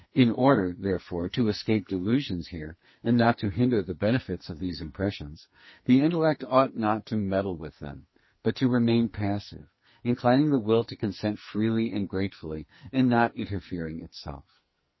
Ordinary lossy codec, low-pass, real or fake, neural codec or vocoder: MP3, 24 kbps; 7.2 kHz; fake; codec, 44.1 kHz, 2.6 kbps, SNAC